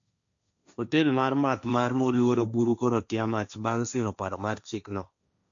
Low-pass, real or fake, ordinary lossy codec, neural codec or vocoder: 7.2 kHz; fake; none; codec, 16 kHz, 1.1 kbps, Voila-Tokenizer